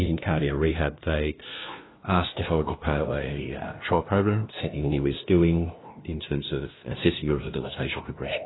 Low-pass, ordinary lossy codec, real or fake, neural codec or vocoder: 7.2 kHz; AAC, 16 kbps; fake; codec, 16 kHz, 0.5 kbps, FunCodec, trained on LibriTTS, 25 frames a second